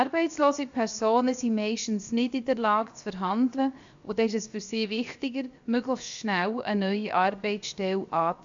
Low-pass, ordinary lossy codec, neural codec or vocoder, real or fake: 7.2 kHz; AAC, 64 kbps; codec, 16 kHz, 0.7 kbps, FocalCodec; fake